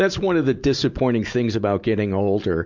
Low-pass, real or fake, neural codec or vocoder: 7.2 kHz; real; none